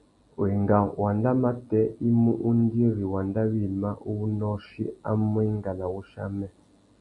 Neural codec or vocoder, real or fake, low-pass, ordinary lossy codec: none; real; 10.8 kHz; Opus, 64 kbps